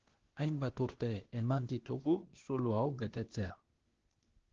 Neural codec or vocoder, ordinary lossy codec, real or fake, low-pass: codec, 16 kHz, 0.8 kbps, ZipCodec; Opus, 16 kbps; fake; 7.2 kHz